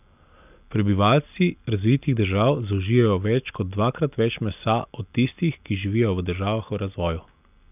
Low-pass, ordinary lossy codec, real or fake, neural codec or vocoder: 3.6 kHz; none; real; none